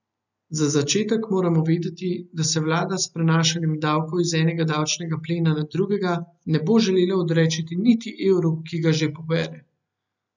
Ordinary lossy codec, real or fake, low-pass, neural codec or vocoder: none; real; 7.2 kHz; none